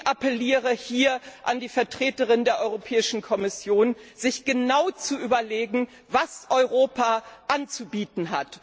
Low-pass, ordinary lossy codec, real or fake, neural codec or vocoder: none; none; real; none